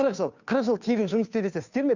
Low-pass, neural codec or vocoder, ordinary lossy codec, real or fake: 7.2 kHz; codec, 16 kHz, 2 kbps, FunCodec, trained on Chinese and English, 25 frames a second; none; fake